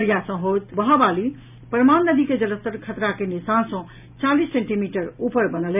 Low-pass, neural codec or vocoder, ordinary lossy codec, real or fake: 3.6 kHz; none; none; real